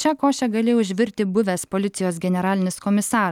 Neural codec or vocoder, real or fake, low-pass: none; real; 19.8 kHz